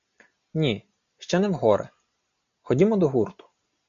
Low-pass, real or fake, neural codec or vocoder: 7.2 kHz; real; none